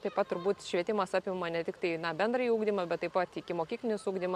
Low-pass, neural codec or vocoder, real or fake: 14.4 kHz; none; real